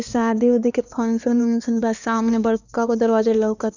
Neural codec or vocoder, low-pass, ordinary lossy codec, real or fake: codec, 16 kHz, 2 kbps, X-Codec, HuBERT features, trained on LibriSpeech; 7.2 kHz; none; fake